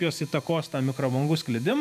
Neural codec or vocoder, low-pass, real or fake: vocoder, 44.1 kHz, 128 mel bands every 512 samples, BigVGAN v2; 14.4 kHz; fake